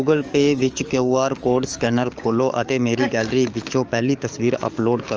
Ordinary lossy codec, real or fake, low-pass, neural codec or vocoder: Opus, 24 kbps; fake; 7.2 kHz; codec, 44.1 kHz, 7.8 kbps, DAC